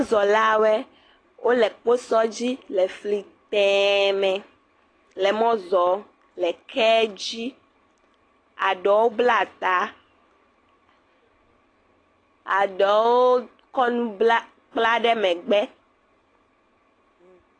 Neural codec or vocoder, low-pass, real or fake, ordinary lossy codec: none; 9.9 kHz; real; AAC, 32 kbps